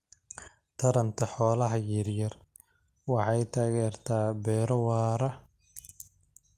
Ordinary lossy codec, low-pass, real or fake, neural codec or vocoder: Opus, 32 kbps; 14.4 kHz; real; none